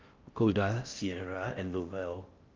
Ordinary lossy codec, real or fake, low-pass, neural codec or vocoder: Opus, 24 kbps; fake; 7.2 kHz; codec, 16 kHz in and 24 kHz out, 0.6 kbps, FocalCodec, streaming, 4096 codes